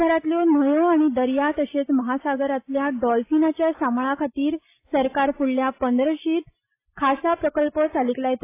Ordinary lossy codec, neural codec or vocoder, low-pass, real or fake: AAC, 24 kbps; none; 3.6 kHz; real